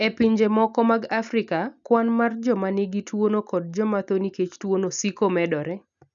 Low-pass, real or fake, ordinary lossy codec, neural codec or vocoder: 7.2 kHz; real; none; none